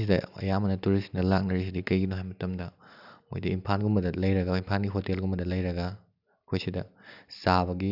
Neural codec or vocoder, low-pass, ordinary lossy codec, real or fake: none; 5.4 kHz; none; real